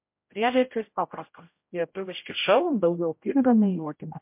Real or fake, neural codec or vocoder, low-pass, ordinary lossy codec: fake; codec, 16 kHz, 0.5 kbps, X-Codec, HuBERT features, trained on general audio; 3.6 kHz; MP3, 32 kbps